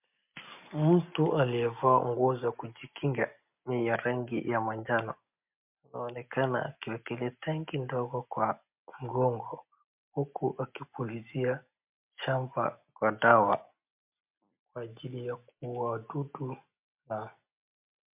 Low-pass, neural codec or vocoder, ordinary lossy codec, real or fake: 3.6 kHz; none; MP3, 32 kbps; real